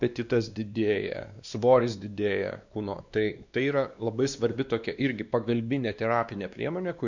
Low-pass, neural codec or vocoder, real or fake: 7.2 kHz; codec, 16 kHz, 2 kbps, X-Codec, WavLM features, trained on Multilingual LibriSpeech; fake